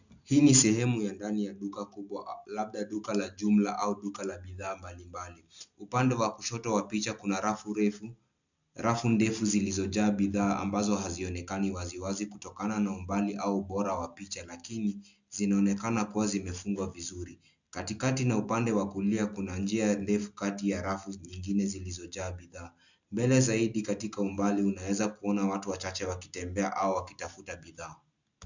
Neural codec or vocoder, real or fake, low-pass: none; real; 7.2 kHz